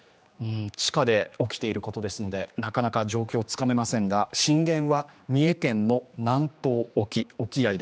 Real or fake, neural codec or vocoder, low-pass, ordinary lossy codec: fake; codec, 16 kHz, 2 kbps, X-Codec, HuBERT features, trained on general audio; none; none